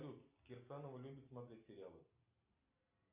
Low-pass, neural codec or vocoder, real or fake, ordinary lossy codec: 3.6 kHz; none; real; Opus, 64 kbps